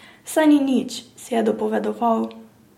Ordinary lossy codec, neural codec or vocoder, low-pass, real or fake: MP3, 64 kbps; none; 19.8 kHz; real